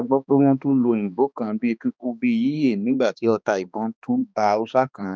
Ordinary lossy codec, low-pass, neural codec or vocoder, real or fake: none; none; codec, 16 kHz, 2 kbps, X-Codec, HuBERT features, trained on balanced general audio; fake